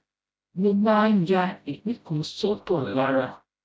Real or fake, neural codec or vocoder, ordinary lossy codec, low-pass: fake; codec, 16 kHz, 0.5 kbps, FreqCodec, smaller model; none; none